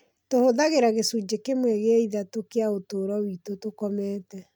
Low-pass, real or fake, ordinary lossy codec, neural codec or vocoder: none; real; none; none